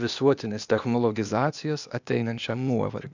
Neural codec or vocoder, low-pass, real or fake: codec, 16 kHz, 0.8 kbps, ZipCodec; 7.2 kHz; fake